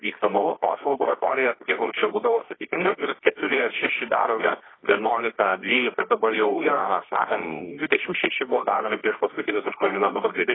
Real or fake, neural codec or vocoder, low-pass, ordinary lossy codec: fake; codec, 24 kHz, 0.9 kbps, WavTokenizer, medium music audio release; 7.2 kHz; AAC, 16 kbps